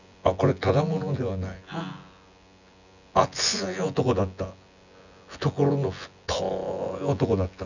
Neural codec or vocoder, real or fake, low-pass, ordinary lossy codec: vocoder, 24 kHz, 100 mel bands, Vocos; fake; 7.2 kHz; none